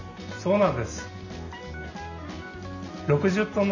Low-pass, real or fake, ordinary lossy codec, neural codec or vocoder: 7.2 kHz; real; none; none